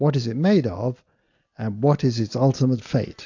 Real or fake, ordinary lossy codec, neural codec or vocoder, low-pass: real; AAC, 48 kbps; none; 7.2 kHz